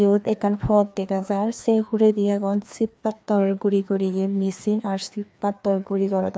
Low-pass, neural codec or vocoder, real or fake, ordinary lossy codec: none; codec, 16 kHz, 2 kbps, FreqCodec, larger model; fake; none